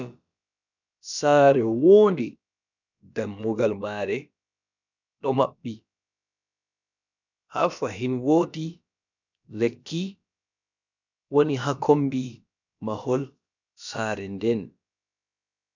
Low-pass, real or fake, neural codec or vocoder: 7.2 kHz; fake; codec, 16 kHz, about 1 kbps, DyCAST, with the encoder's durations